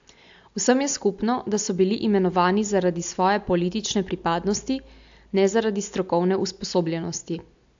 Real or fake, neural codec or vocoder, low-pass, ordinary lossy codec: real; none; 7.2 kHz; AAC, 64 kbps